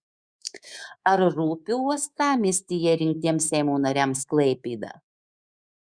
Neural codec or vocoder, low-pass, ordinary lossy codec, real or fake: codec, 24 kHz, 3.1 kbps, DualCodec; 9.9 kHz; Opus, 64 kbps; fake